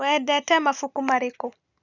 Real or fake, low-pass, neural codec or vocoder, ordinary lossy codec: real; 7.2 kHz; none; none